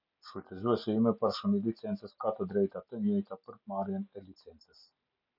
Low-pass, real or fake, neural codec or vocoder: 5.4 kHz; real; none